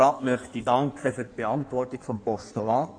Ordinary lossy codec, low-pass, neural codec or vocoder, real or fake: AAC, 64 kbps; 9.9 kHz; codec, 16 kHz in and 24 kHz out, 1.1 kbps, FireRedTTS-2 codec; fake